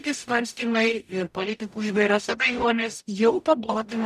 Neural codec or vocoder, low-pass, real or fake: codec, 44.1 kHz, 0.9 kbps, DAC; 14.4 kHz; fake